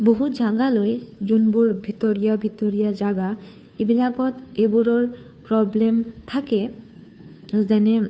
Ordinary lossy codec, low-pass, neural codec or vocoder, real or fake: none; none; codec, 16 kHz, 2 kbps, FunCodec, trained on Chinese and English, 25 frames a second; fake